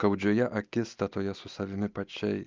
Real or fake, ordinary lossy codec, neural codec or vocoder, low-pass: real; Opus, 32 kbps; none; 7.2 kHz